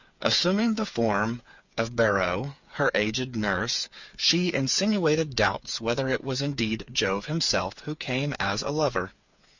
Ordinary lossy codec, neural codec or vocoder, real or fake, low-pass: Opus, 64 kbps; codec, 16 kHz, 8 kbps, FreqCodec, smaller model; fake; 7.2 kHz